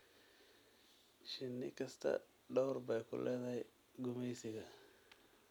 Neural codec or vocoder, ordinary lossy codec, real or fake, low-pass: none; none; real; none